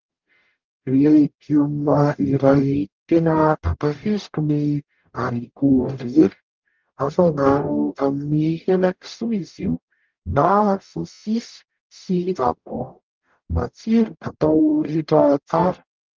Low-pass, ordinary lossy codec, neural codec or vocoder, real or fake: 7.2 kHz; Opus, 32 kbps; codec, 44.1 kHz, 0.9 kbps, DAC; fake